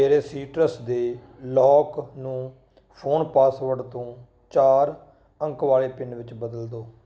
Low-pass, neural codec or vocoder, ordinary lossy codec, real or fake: none; none; none; real